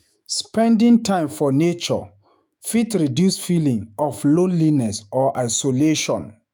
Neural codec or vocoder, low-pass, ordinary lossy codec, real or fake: autoencoder, 48 kHz, 128 numbers a frame, DAC-VAE, trained on Japanese speech; none; none; fake